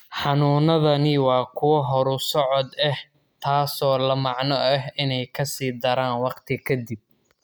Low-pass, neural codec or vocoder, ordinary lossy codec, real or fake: none; none; none; real